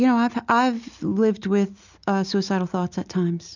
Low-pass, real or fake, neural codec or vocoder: 7.2 kHz; real; none